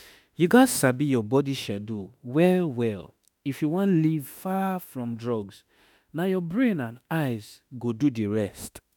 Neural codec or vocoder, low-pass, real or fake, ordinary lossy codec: autoencoder, 48 kHz, 32 numbers a frame, DAC-VAE, trained on Japanese speech; none; fake; none